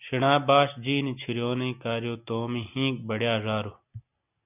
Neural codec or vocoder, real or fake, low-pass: none; real; 3.6 kHz